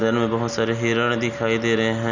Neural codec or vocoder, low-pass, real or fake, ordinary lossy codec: none; 7.2 kHz; real; none